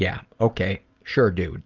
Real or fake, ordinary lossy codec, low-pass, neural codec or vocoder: real; Opus, 24 kbps; 7.2 kHz; none